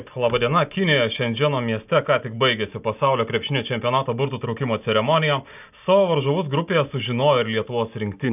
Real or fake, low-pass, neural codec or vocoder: real; 3.6 kHz; none